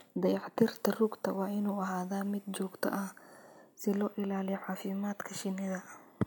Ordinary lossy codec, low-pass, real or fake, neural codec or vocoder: none; none; real; none